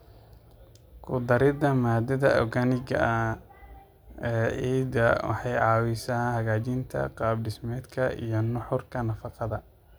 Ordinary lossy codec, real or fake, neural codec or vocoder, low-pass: none; real; none; none